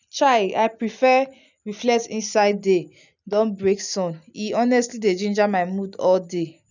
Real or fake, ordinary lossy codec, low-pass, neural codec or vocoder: real; none; 7.2 kHz; none